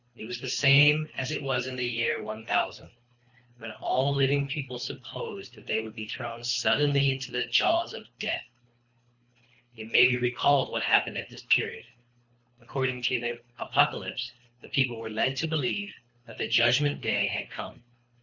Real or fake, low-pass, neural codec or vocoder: fake; 7.2 kHz; codec, 24 kHz, 3 kbps, HILCodec